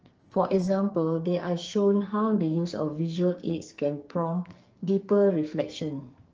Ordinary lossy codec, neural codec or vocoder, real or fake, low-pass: Opus, 24 kbps; codec, 44.1 kHz, 2.6 kbps, SNAC; fake; 7.2 kHz